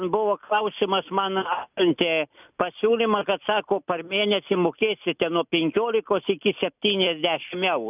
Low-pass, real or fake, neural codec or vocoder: 3.6 kHz; real; none